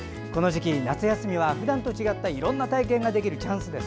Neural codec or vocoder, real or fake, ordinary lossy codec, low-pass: none; real; none; none